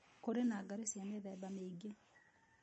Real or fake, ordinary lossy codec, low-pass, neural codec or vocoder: real; MP3, 32 kbps; 10.8 kHz; none